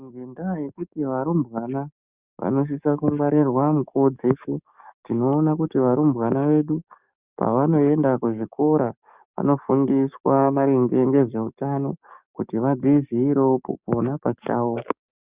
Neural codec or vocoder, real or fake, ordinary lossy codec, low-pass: codec, 44.1 kHz, 7.8 kbps, DAC; fake; Opus, 64 kbps; 3.6 kHz